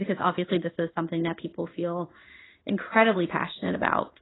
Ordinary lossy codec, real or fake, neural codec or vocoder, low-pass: AAC, 16 kbps; fake; codec, 16 kHz in and 24 kHz out, 1 kbps, XY-Tokenizer; 7.2 kHz